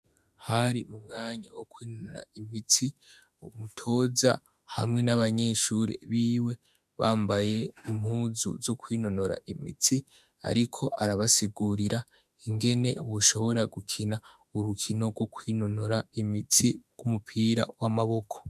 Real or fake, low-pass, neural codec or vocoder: fake; 14.4 kHz; autoencoder, 48 kHz, 32 numbers a frame, DAC-VAE, trained on Japanese speech